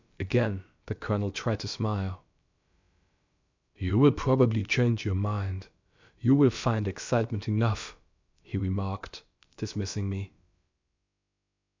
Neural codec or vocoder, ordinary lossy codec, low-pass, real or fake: codec, 16 kHz, about 1 kbps, DyCAST, with the encoder's durations; MP3, 64 kbps; 7.2 kHz; fake